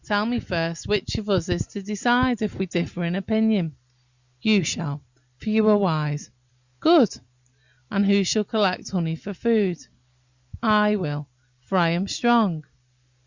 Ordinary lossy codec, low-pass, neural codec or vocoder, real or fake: Opus, 64 kbps; 7.2 kHz; none; real